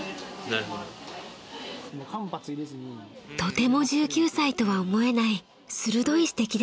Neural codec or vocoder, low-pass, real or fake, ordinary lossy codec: none; none; real; none